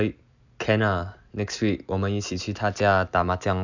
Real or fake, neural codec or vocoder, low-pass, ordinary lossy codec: fake; vocoder, 44.1 kHz, 128 mel bands every 256 samples, BigVGAN v2; 7.2 kHz; none